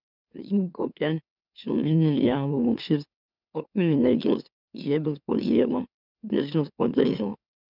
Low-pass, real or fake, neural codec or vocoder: 5.4 kHz; fake; autoencoder, 44.1 kHz, a latent of 192 numbers a frame, MeloTTS